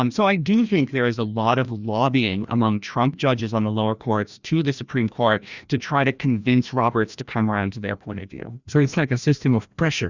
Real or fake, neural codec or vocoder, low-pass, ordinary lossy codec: fake; codec, 16 kHz, 1 kbps, FreqCodec, larger model; 7.2 kHz; Opus, 64 kbps